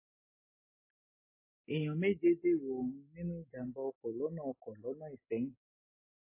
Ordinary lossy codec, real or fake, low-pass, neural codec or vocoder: MP3, 16 kbps; real; 3.6 kHz; none